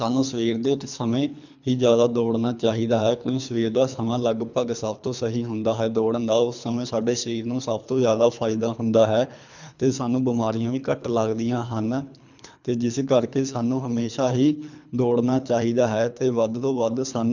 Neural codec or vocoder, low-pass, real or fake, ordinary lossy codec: codec, 24 kHz, 3 kbps, HILCodec; 7.2 kHz; fake; none